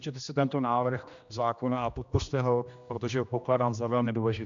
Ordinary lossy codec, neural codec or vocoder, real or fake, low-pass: MP3, 64 kbps; codec, 16 kHz, 1 kbps, X-Codec, HuBERT features, trained on general audio; fake; 7.2 kHz